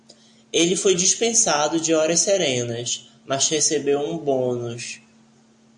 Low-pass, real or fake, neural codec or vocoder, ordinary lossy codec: 10.8 kHz; real; none; AAC, 64 kbps